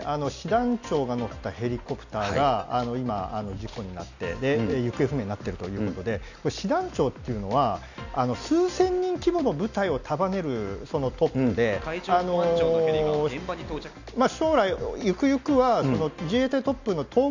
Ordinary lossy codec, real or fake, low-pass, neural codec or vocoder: none; real; 7.2 kHz; none